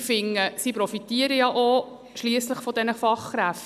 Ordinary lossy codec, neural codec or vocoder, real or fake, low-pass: none; none; real; 14.4 kHz